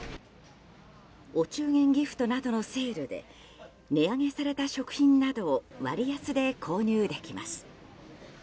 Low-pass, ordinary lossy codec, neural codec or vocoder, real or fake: none; none; none; real